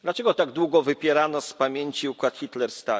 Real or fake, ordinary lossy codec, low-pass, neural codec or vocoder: real; none; none; none